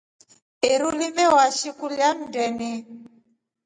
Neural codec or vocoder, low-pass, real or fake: none; 9.9 kHz; real